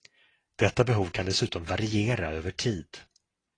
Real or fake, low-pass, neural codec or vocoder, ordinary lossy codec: real; 9.9 kHz; none; AAC, 32 kbps